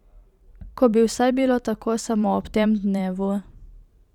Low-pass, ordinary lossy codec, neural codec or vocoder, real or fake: 19.8 kHz; none; vocoder, 44.1 kHz, 128 mel bands every 512 samples, BigVGAN v2; fake